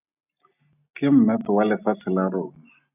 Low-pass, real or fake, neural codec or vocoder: 3.6 kHz; real; none